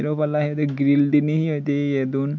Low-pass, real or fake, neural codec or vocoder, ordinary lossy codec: 7.2 kHz; real; none; none